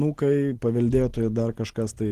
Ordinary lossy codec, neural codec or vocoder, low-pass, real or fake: Opus, 16 kbps; none; 14.4 kHz; real